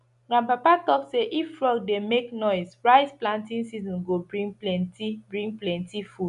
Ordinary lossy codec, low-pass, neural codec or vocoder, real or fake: none; 10.8 kHz; none; real